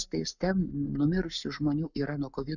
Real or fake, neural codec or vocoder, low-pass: fake; codec, 44.1 kHz, 7.8 kbps, Pupu-Codec; 7.2 kHz